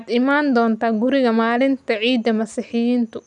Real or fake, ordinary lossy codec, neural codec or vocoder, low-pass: real; none; none; 10.8 kHz